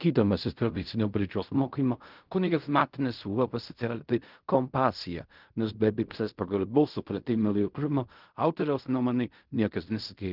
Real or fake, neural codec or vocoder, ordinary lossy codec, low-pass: fake; codec, 16 kHz in and 24 kHz out, 0.4 kbps, LongCat-Audio-Codec, fine tuned four codebook decoder; Opus, 32 kbps; 5.4 kHz